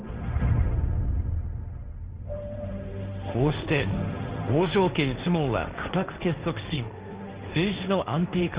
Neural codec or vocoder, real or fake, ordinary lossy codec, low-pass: codec, 16 kHz, 1.1 kbps, Voila-Tokenizer; fake; Opus, 32 kbps; 3.6 kHz